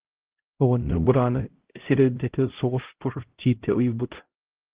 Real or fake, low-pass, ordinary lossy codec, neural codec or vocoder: fake; 3.6 kHz; Opus, 16 kbps; codec, 16 kHz, 0.5 kbps, X-Codec, HuBERT features, trained on LibriSpeech